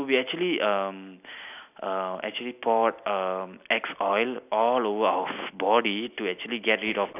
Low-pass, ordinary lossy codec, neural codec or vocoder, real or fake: 3.6 kHz; none; none; real